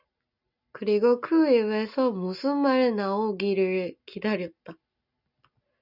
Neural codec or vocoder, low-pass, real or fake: none; 5.4 kHz; real